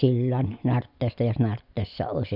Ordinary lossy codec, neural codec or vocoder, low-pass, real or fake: none; none; 5.4 kHz; real